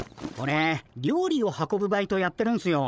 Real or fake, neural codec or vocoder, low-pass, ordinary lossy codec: fake; codec, 16 kHz, 16 kbps, FreqCodec, larger model; none; none